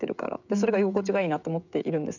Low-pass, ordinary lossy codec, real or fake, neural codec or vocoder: 7.2 kHz; none; fake; codec, 16 kHz, 16 kbps, FreqCodec, smaller model